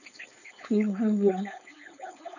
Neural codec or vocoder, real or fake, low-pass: codec, 16 kHz, 4.8 kbps, FACodec; fake; 7.2 kHz